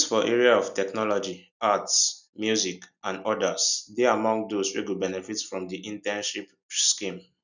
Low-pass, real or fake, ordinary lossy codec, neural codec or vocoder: 7.2 kHz; real; none; none